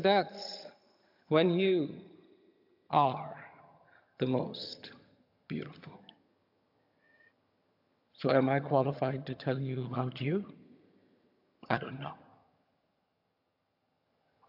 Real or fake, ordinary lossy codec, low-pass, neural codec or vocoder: fake; AAC, 48 kbps; 5.4 kHz; vocoder, 22.05 kHz, 80 mel bands, HiFi-GAN